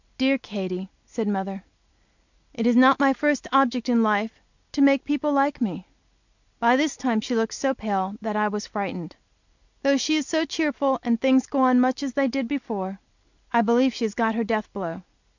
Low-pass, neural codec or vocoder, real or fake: 7.2 kHz; none; real